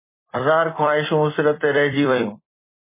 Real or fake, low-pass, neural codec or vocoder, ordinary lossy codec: fake; 3.6 kHz; vocoder, 44.1 kHz, 128 mel bands every 256 samples, BigVGAN v2; MP3, 16 kbps